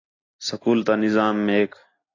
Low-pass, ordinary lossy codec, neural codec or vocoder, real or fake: 7.2 kHz; AAC, 32 kbps; none; real